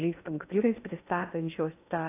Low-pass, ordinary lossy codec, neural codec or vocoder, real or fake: 3.6 kHz; AAC, 24 kbps; codec, 16 kHz in and 24 kHz out, 0.6 kbps, FocalCodec, streaming, 2048 codes; fake